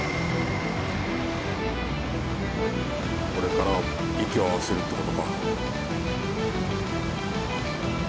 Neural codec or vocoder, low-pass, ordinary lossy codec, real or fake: none; none; none; real